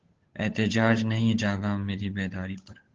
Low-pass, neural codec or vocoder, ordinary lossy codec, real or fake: 7.2 kHz; codec, 16 kHz, 8 kbps, FunCodec, trained on Chinese and English, 25 frames a second; Opus, 16 kbps; fake